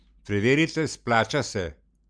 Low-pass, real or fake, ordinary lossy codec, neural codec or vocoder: 9.9 kHz; real; none; none